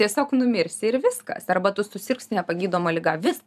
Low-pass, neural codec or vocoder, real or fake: 14.4 kHz; vocoder, 44.1 kHz, 128 mel bands every 512 samples, BigVGAN v2; fake